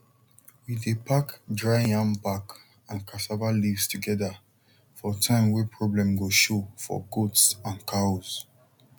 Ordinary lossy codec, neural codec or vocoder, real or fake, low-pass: none; none; real; 19.8 kHz